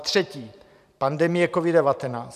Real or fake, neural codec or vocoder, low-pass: fake; vocoder, 44.1 kHz, 128 mel bands every 512 samples, BigVGAN v2; 14.4 kHz